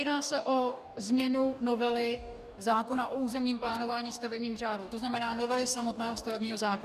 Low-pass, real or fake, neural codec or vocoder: 14.4 kHz; fake; codec, 44.1 kHz, 2.6 kbps, DAC